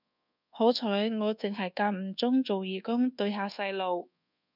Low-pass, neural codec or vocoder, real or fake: 5.4 kHz; codec, 24 kHz, 1.2 kbps, DualCodec; fake